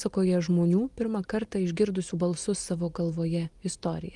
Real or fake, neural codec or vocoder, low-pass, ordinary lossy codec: real; none; 10.8 kHz; Opus, 32 kbps